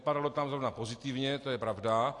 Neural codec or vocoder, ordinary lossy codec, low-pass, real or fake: none; AAC, 48 kbps; 10.8 kHz; real